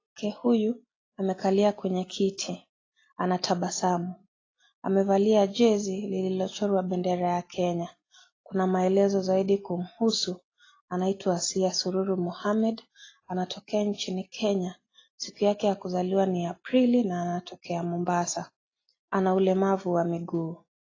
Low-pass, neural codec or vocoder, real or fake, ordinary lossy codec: 7.2 kHz; none; real; AAC, 32 kbps